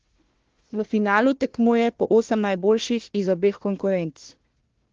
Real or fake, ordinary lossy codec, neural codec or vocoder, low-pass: fake; Opus, 16 kbps; codec, 16 kHz, 1 kbps, FunCodec, trained on Chinese and English, 50 frames a second; 7.2 kHz